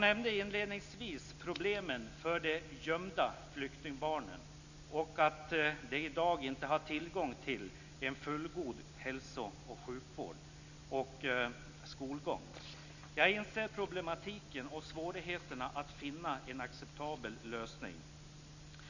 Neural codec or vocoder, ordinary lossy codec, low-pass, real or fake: none; none; 7.2 kHz; real